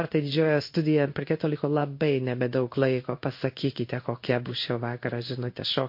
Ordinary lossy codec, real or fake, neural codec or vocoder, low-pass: MP3, 32 kbps; fake; codec, 16 kHz in and 24 kHz out, 1 kbps, XY-Tokenizer; 5.4 kHz